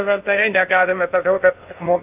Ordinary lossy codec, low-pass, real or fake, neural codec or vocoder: AAC, 32 kbps; 3.6 kHz; fake; codec, 16 kHz in and 24 kHz out, 0.6 kbps, FocalCodec, streaming, 2048 codes